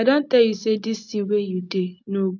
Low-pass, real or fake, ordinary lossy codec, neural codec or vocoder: 7.2 kHz; real; none; none